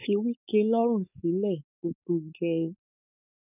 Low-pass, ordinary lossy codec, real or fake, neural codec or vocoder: 3.6 kHz; none; fake; codec, 16 kHz, 4.8 kbps, FACodec